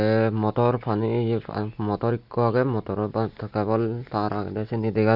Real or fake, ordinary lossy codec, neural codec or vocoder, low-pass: real; none; none; 5.4 kHz